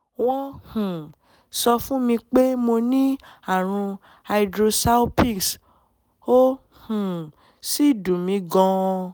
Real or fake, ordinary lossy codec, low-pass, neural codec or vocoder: real; none; none; none